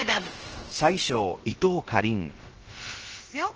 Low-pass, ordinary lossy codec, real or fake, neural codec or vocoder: 7.2 kHz; Opus, 16 kbps; fake; codec, 16 kHz, about 1 kbps, DyCAST, with the encoder's durations